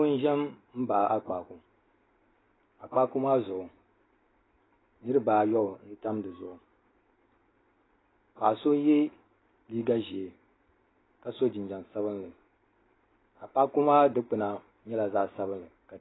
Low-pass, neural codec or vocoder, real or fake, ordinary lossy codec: 7.2 kHz; none; real; AAC, 16 kbps